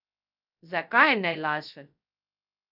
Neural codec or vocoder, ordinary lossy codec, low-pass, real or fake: codec, 16 kHz, 0.2 kbps, FocalCodec; none; 5.4 kHz; fake